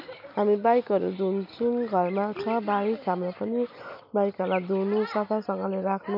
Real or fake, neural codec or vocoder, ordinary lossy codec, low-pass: real; none; MP3, 48 kbps; 5.4 kHz